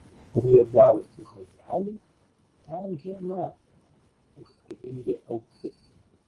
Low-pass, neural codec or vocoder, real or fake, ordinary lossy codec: 10.8 kHz; codec, 24 kHz, 1.5 kbps, HILCodec; fake; Opus, 32 kbps